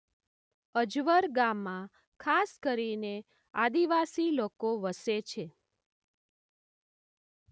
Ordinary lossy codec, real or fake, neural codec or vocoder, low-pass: none; real; none; none